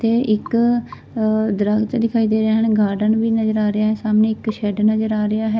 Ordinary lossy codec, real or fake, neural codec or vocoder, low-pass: none; real; none; none